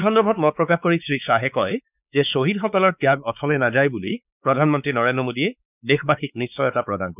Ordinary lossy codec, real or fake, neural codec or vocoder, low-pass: none; fake; codec, 16 kHz, 4 kbps, X-Codec, WavLM features, trained on Multilingual LibriSpeech; 3.6 kHz